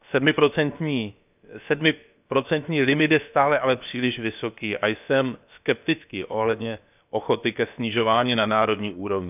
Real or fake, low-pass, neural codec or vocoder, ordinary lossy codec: fake; 3.6 kHz; codec, 16 kHz, about 1 kbps, DyCAST, with the encoder's durations; none